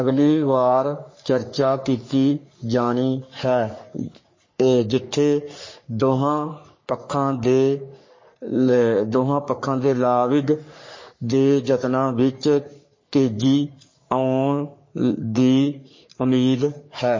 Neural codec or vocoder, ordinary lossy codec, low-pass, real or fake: codec, 44.1 kHz, 3.4 kbps, Pupu-Codec; MP3, 32 kbps; 7.2 kHz; fake